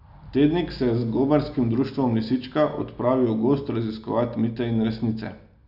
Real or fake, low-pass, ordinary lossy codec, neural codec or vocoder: real; 5.4 kHz; none; none